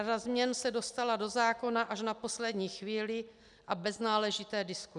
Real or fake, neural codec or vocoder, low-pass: real; none; 10.8 kHz